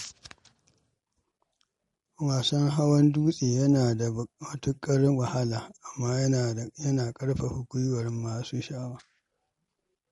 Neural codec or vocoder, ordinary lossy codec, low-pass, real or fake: none; MP3, 48 kbps; 19.8 kHz; real